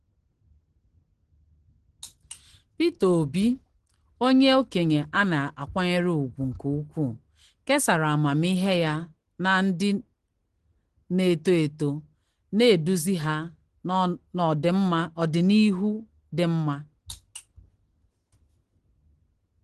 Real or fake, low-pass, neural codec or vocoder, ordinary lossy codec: real; 10.8 kHz; none; Opus, 16 kbps